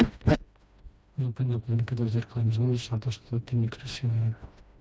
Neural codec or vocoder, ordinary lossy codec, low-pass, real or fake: codec, 16 kHz, 1 kbps, FreqCodec, smaller model; none; none; fake